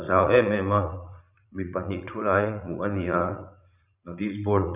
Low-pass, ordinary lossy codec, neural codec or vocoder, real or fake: 3.6 kHz; none; vocoder, 44.1 kHz, 80 mel bands, Vocos; fake